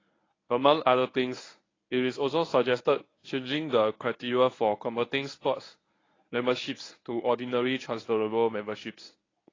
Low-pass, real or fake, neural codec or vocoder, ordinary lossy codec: 7.2 kHz; fake; codec, 24 kHz, 0.9 kbps, WavTokenizer, medium speech release version 1; AAC, 32 kbps